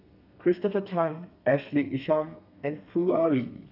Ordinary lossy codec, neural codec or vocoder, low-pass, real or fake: none; codec, 32 kHz, 1.9 kbps, SNAC; 5.4 kHz; fake